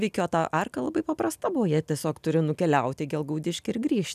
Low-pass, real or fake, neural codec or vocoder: 14.4 kHz; real; none